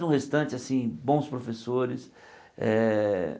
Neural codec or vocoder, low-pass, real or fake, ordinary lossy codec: none; none; real; none